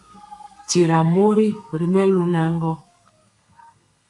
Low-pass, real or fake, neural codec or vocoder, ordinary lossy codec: 10.8 kHz; fake; codec, 32 kHz, 1.9 kbps, SNAC; AAC, 64 kbps